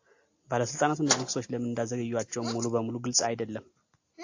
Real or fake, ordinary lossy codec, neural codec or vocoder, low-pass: real; AAC, 48 kbps; none; 7.2 kHz